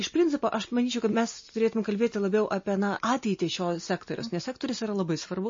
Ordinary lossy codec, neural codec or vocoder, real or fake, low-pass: MP3, 32 kbps; none; real; 7.2 kHz